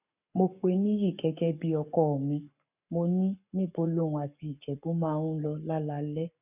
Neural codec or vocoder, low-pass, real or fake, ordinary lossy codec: codec, 44.1 kHz, 7.8 kbps, Pupu-Codec; 3.6 kHz; fake; none